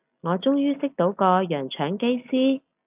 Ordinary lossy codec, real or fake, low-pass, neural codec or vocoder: AAC, 32 kbps; real; 3.6 kHz; none